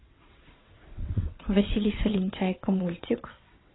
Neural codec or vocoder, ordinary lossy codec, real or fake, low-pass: vocoder, 22.05 kHz, 80 mel bands, WaveNeXt; AAC, 16 kbps; fake; 7.2 kHz